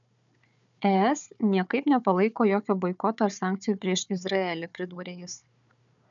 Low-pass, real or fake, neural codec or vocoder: 7.2 kHz; fake; codec, 16 kHz, 4 kbps, FunCodec, trained on Chinese and English, 50 frames a second